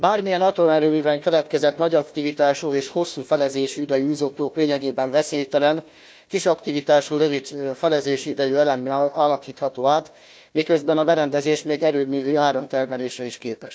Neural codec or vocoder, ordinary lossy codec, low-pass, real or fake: codec, 16 kHz, 1 kbps, FunCodec, trained on Chinese and English, 50 frames a second; none; none; fake